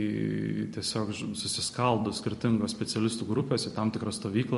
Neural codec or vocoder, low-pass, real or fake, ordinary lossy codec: vocoder, 44.1 kHz, 128 mel bands every 256 samples, BigVGAN v2; 14.4 kHz; fake; MP3, 48 kbps